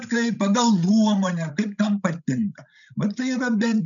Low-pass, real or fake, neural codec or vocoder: 7.2 kHz; fake; codec, 16 kHz, 16 kbps, FreqCodec, larger model